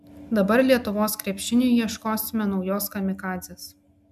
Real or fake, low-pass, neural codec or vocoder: real; 14.4 kHz; none